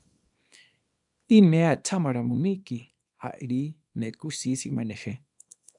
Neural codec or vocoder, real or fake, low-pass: codec, 24 kHz, 0.9 kbps, WavTokenizer, small release; fake; 10.8 kHz